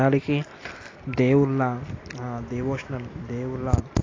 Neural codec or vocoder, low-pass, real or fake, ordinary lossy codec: none; 7.2 kHz; real; AAC, 32 kbps